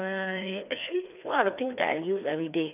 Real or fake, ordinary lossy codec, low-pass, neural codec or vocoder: fake; none; 3.6 kHz; codec, 16 kHz, 2 kbps, FreqCodec, larger model